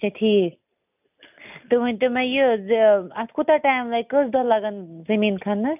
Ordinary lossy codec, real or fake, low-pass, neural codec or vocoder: none; real; 3.6 kHz; none